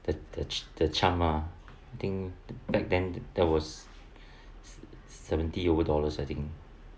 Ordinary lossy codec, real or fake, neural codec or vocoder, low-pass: none; real; none; none